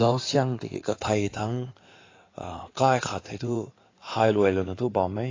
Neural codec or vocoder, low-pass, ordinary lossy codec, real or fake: codec, 16 kHz in and 24 kHz out, 2.2 kbps, FireRedTTS-2 codec; 7.2 kHz; AAC, 32 kbps; fake